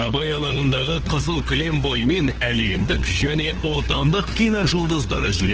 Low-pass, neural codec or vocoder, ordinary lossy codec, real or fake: 7.2 kHz; codec, 16 kHz, 2 kbps, FunCodec, trained on LibriTTS, 25 frames a second; Opus, 16 kbps; fake